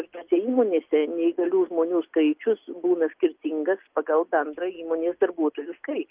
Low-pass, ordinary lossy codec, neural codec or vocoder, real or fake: 3.6 kHz; Opus, 32 kbps; none; real